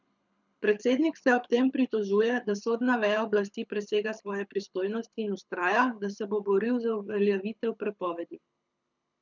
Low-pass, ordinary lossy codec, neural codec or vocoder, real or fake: 7.2 kHz; none; codec, 24 kHz, 6 kbps, HILCodec; fake